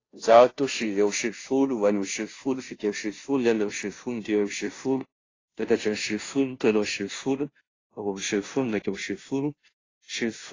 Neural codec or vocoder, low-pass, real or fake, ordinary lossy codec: codec, 16 kHz, 0.5 kbps, FunCodec, trained on Chinese and English, 25 frames a second; 7.2 kHz; fake; AAC, 32 kbps